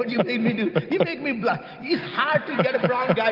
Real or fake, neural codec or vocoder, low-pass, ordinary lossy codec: real; none; 5.4 kHz; Opus, 24 kbps